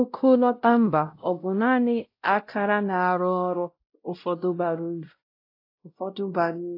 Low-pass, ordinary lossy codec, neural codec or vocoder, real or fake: 5.4 kHz; AAC, 32 kbps; codec, 16 kHz, 0.5 kbps, X-Codec, WavLM features, trained on Multilingual LibriSpeech; fake